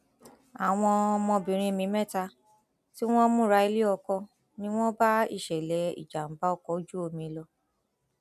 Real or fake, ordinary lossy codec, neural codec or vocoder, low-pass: real; none; none; 14.4 kHz